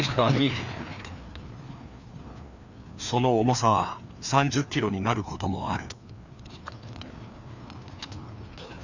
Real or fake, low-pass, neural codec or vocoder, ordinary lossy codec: fake; 7.2 kHz; codec, 16 kHz, 2 kbps, FreqCodec, larger model; none